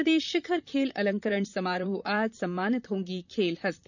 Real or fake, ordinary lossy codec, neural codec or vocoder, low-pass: fake; none; vocoder, 44.1 kHz, 128 mel bands, Pupu-Vocoder; 7.2 kHz